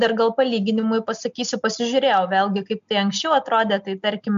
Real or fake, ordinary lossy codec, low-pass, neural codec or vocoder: real; MP3, 64 kbps; 7.2 kHz; none